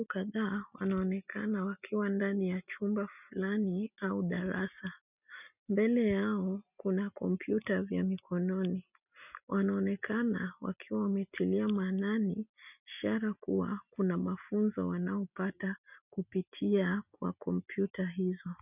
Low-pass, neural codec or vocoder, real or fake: 3.6 kHz; none; real